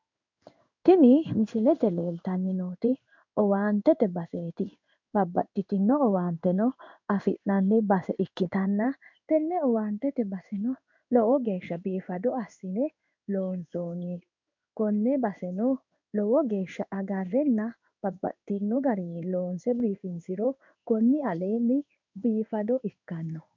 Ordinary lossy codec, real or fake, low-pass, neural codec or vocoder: MP3, 64 kbps; fake; 7.2 kHz; codec, 16 kHz in and 24 kHz out, 1 kbps, XY-Tokenizer